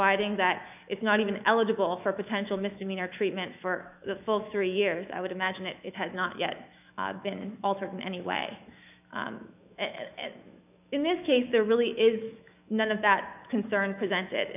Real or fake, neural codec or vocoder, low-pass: fake; autoencoder, 48 kHz, 128 numbers a frame, DAC-VAE, trained on Japanese speech; 3.6 kHz